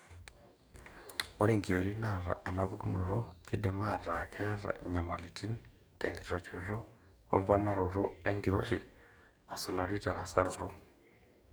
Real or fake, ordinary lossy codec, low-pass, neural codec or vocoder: fake; none; none; codec, 44.1 kHz, 2.6 kbps, DAC